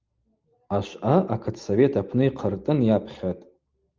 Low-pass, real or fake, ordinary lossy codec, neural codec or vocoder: 7.2 kHz; real; Opus, 32 kbps; none